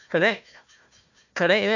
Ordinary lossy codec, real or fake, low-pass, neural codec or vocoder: none; fake; 7.2 kHz; codec, 16 kHz, 1 kbps, FunCodec, trained on Chinese and English, 50 frames a second